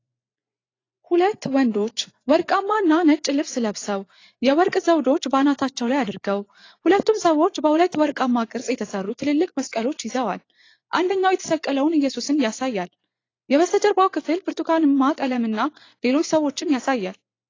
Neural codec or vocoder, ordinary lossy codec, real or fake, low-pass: vocoder, 24 kHz, 100 mel bands, Vocos; AAC, 32 kbps; fake; 7.2 kHz